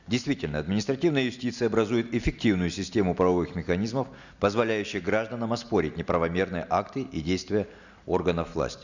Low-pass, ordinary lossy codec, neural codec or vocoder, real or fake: 7.2 kHz; none; none; real